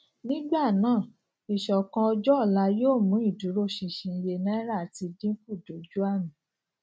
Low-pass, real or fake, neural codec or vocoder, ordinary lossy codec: none; real; none; none